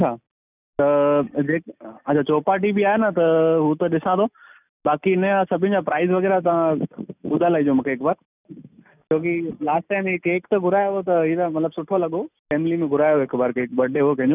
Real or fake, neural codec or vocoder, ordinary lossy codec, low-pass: real; none; none; 3.6 kHz